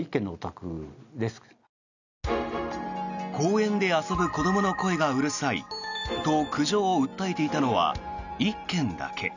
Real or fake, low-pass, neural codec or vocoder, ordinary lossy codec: real; 7.2 kHz; none; none